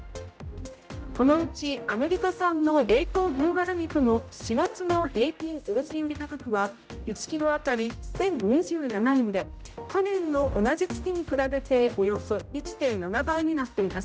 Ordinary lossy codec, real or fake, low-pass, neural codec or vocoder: none; fake; none; codec, 16 kHz, 0.5 kbps, X-Codec, HuBERT features, trained on general audio